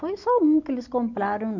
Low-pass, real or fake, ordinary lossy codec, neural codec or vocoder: 7.2 kHz; real; none; none